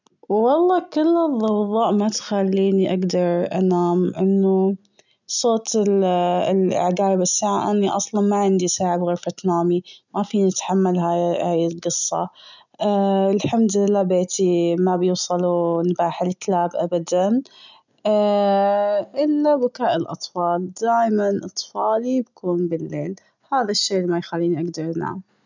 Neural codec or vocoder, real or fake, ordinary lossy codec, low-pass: none; real; none; 7.2 kHz